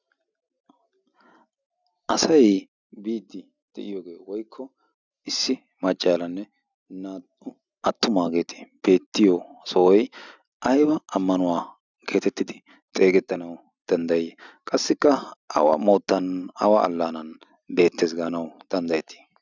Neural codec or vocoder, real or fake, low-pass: none; real; 7.2 kHz